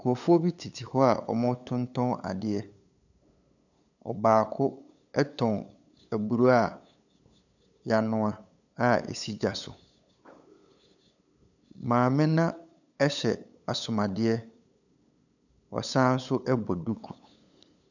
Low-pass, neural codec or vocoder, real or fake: 7.2 kHz; codec, 16 kHz, 8 kbps, FunCodec, trained on LibriTTS, 25 frames a second; fake